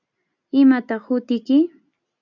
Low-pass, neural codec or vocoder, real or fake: 7.2 kHz; none; real